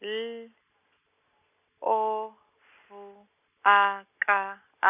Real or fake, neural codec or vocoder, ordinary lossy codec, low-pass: real; none; none; 3.6 kHz